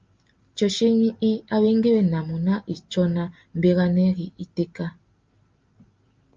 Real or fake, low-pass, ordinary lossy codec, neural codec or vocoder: real; 7.2 kHz; Opus, 24 kbps; none